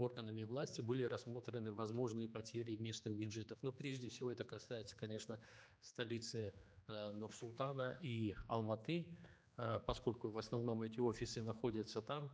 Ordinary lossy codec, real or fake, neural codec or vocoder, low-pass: none; fake; codec, 16 kHz, 2 kbps, X-Codec, HuBERT features, trained on general audio; none